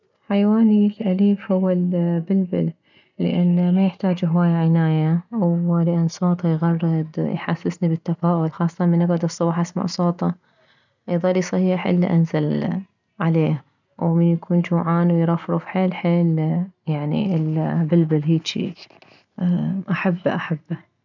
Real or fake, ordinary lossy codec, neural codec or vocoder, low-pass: real; none; none; 7.2 kHz